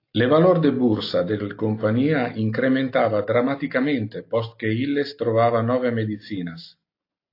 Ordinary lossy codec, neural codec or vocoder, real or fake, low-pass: AAC, 32 kbps; none; real; 5.4 kHz